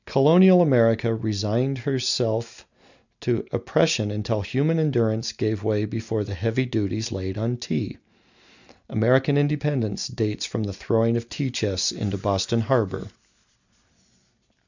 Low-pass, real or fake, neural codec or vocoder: 7.2 kHz; real; none